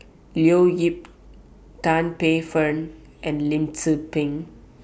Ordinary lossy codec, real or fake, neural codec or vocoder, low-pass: none; real; none; none